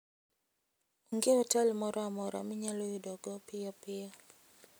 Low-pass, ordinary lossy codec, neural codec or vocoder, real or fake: none; none; none; real